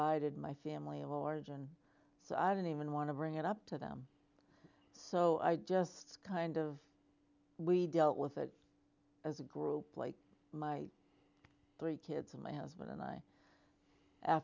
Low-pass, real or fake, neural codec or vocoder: 7.2 kHz; real; none